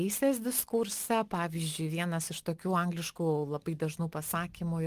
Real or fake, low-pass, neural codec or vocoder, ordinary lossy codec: real; 14.4 kHz; none; Opus, 24 kbps